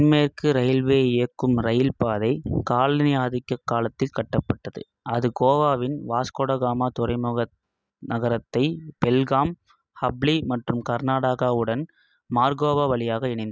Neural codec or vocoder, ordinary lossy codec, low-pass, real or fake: none; none; none; real